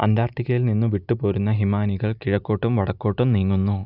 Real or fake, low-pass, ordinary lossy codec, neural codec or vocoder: real; 5.4 kHz; Opus, 64 kbps; none